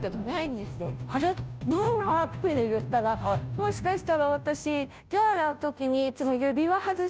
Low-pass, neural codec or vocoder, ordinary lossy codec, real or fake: none; codec, 16 kHz, 0.5 kbps, FunCodec, trained on Chinese and English, 25 frames a second; none; fake